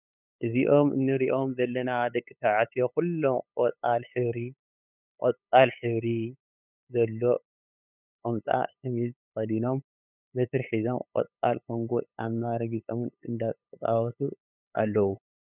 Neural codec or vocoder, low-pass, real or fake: codec, 16 kHz, 8 kbps, FunCodec, trained on LibriTTS, 25 frames a second; 3.6 kHz; fake